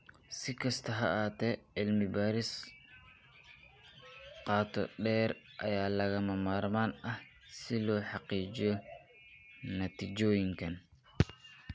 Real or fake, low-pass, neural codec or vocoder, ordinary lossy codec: real; none; none; none